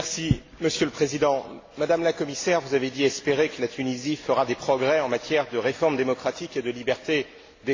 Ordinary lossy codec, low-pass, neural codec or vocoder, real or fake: AAC, 32 kbps; 7.2 kHz; none; real